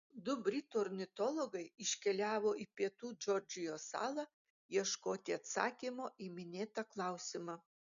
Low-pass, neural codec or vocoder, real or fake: 7.2 kHz; none; real